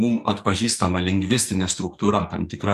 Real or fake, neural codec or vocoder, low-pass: fake; codec, 44.1 kHz, 2.6 kbps, SNAC; 14.4 kHz